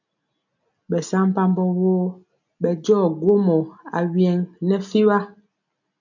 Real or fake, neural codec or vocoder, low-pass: real; none; 7.2 kHz